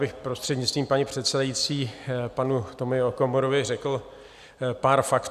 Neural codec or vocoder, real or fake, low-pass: none; real; 14.4 kHz